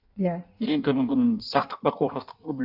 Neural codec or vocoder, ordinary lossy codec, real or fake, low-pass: codec, 16 kHz in and 24 kHz out, 1.1 kbps, FireRedTTS-2 codec; none; fake; 5.4 kHz